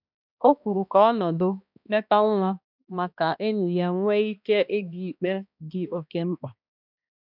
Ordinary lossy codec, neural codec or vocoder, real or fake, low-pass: none; codec, 16 kHz, 1 kbps, X-Codec, HuBERT features, trained on balanced general audio; fake; 5.4 kHz